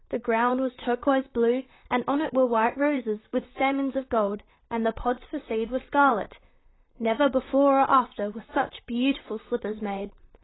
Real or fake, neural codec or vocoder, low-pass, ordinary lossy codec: fake; vocoder, 44.1 kHz, 128 mel bands, Pupu-Vocoder; 7.2 kHz; AAC, 16 kbps